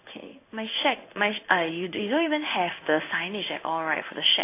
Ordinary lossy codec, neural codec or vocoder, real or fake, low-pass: AAC, 24 kbps; codec, 16 kHz in and 24 kHz out, 1 kbps, XY-Tokenizer; fake; 3.6 kHz